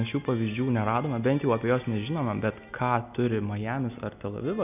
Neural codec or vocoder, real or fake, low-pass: none; real; 3.6 kHz